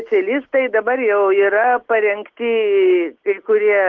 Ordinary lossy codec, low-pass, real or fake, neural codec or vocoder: Opus, 16 kbps; 7.2 kHz; real; none